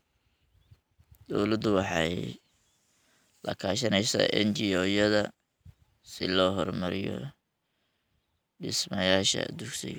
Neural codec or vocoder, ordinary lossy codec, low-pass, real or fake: none; none; none; real